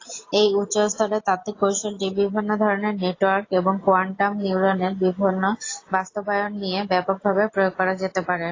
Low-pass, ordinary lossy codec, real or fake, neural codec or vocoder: 7.2 kHz; AAC, 32 kbps; real; none